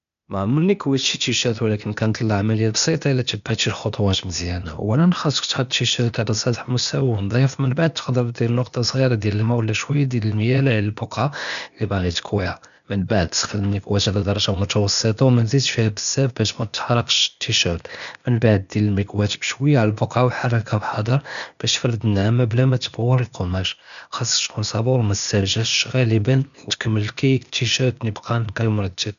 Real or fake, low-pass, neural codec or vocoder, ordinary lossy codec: fake; 7.2 kHz; codec, 16 kHz, 0.8 kbps, ZipCodec; none